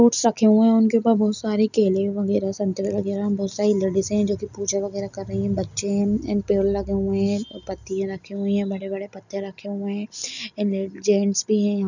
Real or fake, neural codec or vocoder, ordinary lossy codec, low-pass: real; none; none; 7.2 kHz